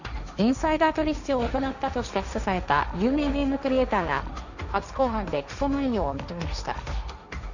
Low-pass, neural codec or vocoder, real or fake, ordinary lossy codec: 7.2 kHz; codec, 16 kHz, 1.1 kbps, Voila-Tokenizer; fake; none